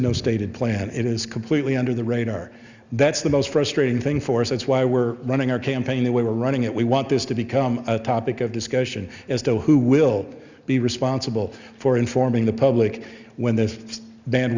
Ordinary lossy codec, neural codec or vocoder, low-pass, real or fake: Opus, 64 kbps; none; 7.2 kHz; real